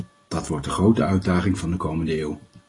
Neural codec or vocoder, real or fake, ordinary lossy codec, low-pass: none; real; AAC, 32 kbps; 10.8 kHz